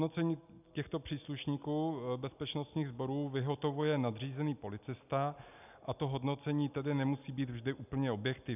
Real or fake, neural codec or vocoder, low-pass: real; none; 3.6 kHz